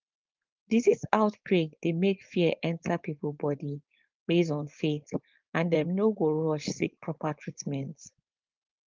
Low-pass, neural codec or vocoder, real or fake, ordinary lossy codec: 7.2 kHz; codec, 16 kHz, 4.8 kbps, FACodec; fake; Opus, 32 kbps